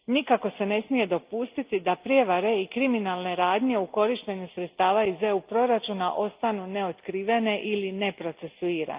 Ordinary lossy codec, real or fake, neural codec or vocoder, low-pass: Opus, 24 kbps; real; none; 3.6 kHz